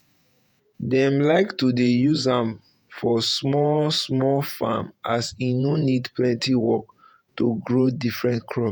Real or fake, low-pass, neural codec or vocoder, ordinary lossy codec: fake; none; vocoder, 48 kHz, 128 mel bands, Vocos; none